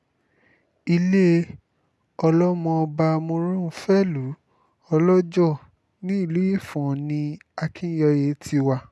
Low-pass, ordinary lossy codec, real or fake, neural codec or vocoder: none; none; real; none